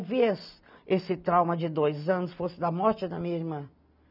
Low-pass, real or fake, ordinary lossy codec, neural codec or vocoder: 5.4 kHz; real; none; none